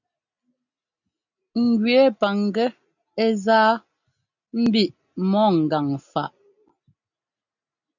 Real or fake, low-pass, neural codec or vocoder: real; 7.2 kHz; none